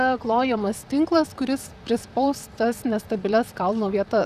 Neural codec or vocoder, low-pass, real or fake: vocoder, 44.1 kHz, 128 mel bands, Pupu-Vocoder; 14.4 kHz; fake